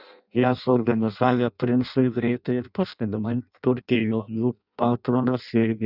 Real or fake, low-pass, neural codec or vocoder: fake; 5.4 kHz; codec, 16 kHz in and 24 kHz out, 0.6 kbps, FireRedTTS-2 codec